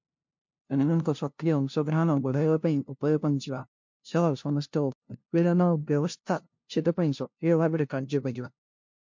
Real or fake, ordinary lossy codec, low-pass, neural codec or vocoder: fake; MP3, 48 kbps; 7.2 kHz; codec, 16 kHz, 0.5 kbps, FunCodec, trained on LibriTTS, 25 frames a second